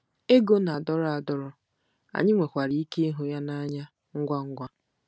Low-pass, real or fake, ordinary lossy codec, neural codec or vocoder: none; real; none; none